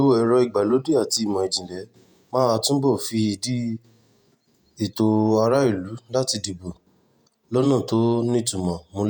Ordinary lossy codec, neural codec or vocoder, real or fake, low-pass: none; vocoder, 48 kHz, 128 mel bands, Vocos; fake; none